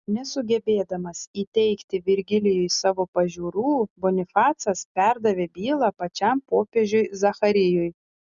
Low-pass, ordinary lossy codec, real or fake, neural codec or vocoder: 7.2 kHz; Opus, 64 kbps; real; none